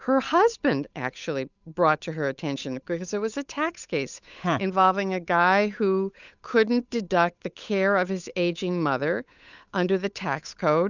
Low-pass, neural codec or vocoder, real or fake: 7.2 kHz; codec, 16 kHz, 8 kbps, FunCodec, trained on Chinese and English, 25 frames a second; fake